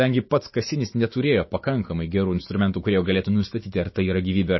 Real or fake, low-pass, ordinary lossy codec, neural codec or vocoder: fake; 7.2 kHz; MP3, 24 kbps; autoencoder, 48 kHz, 128 numbers a frame, DAC-VAE, trained on Japanese speech